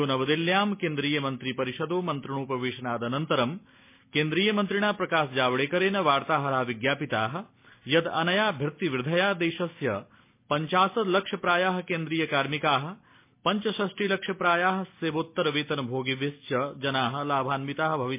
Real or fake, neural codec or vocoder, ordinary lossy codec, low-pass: real; none; MP3, 24 kbps; 3.6 kHz